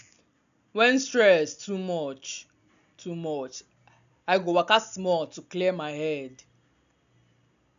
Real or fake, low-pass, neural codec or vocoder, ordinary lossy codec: real; 7.2 kHz; none; none